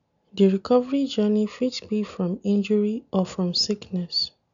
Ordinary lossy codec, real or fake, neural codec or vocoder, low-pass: none; real; none; 7.2 kHz